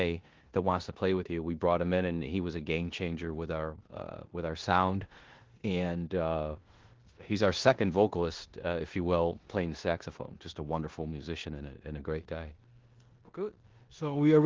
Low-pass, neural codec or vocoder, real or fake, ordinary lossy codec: 7.2 kHz; codec, 16 kHz in and 24 kHz out, 0.9 kbps, LongCat-Audio-Codec, four codebook decoder; fake; Opus, 16 kbps